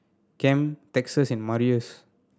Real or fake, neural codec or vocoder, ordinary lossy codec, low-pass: real; none; none; none